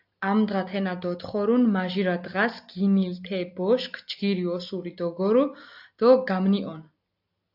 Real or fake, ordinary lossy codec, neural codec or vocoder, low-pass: real; AAC, 48 kbps; none; 5.4 kHz